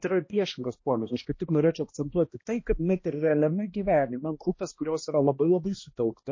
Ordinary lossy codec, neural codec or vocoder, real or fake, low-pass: MP3, 32 kbps; codec, 16 kHz, 1 kbps, X-Codec, HuBERT features, trained on balanced general audio; fake; 7.2 kHz